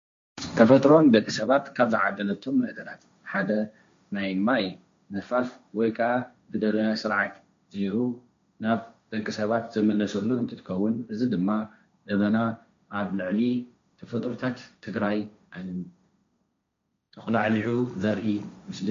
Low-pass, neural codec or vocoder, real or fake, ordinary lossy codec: 7.2 kHz; codec, 16 kHz, 1.1 kbps, Voila-Tokenizer; fake; MP3, 48 kbps